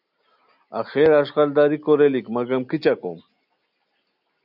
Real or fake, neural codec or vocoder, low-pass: real; none; 5.4 kHz